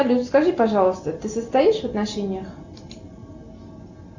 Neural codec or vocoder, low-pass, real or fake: none; 7.2 kHz; real